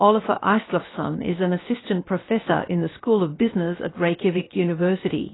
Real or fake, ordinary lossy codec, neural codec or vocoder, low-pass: fake; AAC, 16 kbps; codec, 16 kHz, 0.7 kbps, FocalCodec; 7.2 kHz